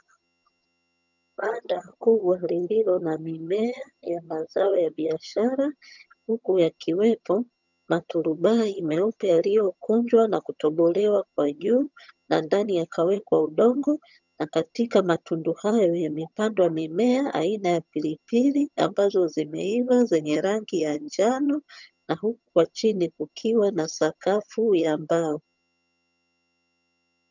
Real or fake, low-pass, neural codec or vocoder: fake; 7.2 kHz; vocoder, 22.05 kHz, 80 mel bands, HiFi-GAN